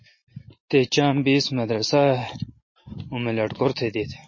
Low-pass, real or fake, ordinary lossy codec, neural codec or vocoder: 7.2 kHz; real; MP3, 32 kbps; none